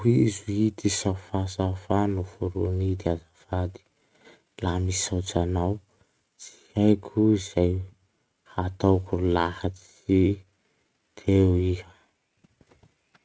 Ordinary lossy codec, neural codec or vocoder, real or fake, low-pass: none; none; real; none